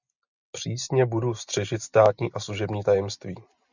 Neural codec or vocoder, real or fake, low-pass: none; real; 7.2 kHz